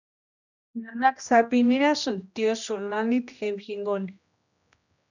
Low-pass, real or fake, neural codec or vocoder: 7.2 kHz; fake; codec, 16 kHz, 1 kbps, X-Codec, HuBERT features, trained on general audio